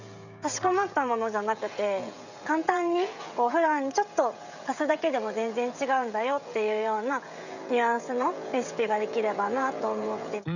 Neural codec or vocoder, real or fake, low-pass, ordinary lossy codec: codec, 16 kHz, 16 kbps, FreqCodec, smaller model; fake; 7.2 kHz; none